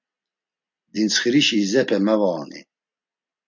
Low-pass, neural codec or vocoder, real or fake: 7.2 kHz; none; real